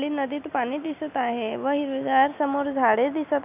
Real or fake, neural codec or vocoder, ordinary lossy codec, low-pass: real; none; none; 3.6 kHz